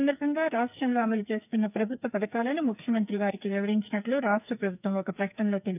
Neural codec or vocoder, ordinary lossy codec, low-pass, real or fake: codec, 44.1 kHz, 2.6 kbps, SNAC; none; 3.6 kHz; fake